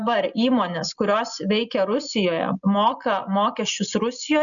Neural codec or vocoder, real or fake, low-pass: none; real; 7.2 kHz